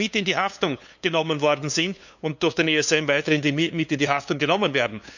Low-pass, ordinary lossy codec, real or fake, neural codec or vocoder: 7.2 kHz; none; fake; codec, 16 kHz, 2 kbps, FunCodec, trained on LibriTTS, 25 frames a second